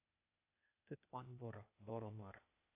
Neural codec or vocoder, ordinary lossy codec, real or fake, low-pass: codec, 16 kHz, 0.8 kbps, ZipCodec; AAC, 32 kbps; fake; 3.6 kHz